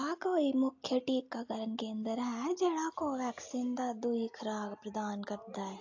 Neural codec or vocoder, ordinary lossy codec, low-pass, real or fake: none; AAC, 48 kbps; 7.2 kHz; real